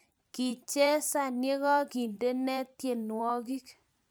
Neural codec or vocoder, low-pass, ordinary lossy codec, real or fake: vocoder, 44.1 kHz, 128 mel bands every 512 samples, BigVGAN v2; none; none; fake